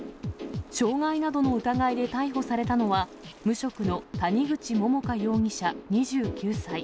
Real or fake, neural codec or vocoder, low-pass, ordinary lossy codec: real; none; none; none